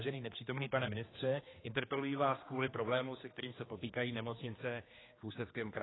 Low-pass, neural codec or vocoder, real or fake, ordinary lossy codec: 7.2 kHz; codec, 16 kHz, 2 kbps, X-Codec, HuBERT features, trained on general audio; fake; AAC, 16 kbps